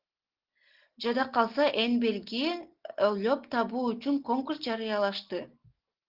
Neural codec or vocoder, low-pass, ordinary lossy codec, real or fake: none; 5.4 kHz; Opus, 16 kbps; real